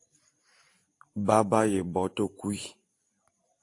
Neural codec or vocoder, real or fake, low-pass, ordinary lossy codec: vocoder, 44.1 kHz, 128 mel bands every 512 samples, BigVGAN v2; fake; 10.8 kHz; AAC, 48 kbps